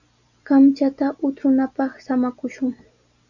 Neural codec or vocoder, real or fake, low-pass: none; real; 7.2 kHz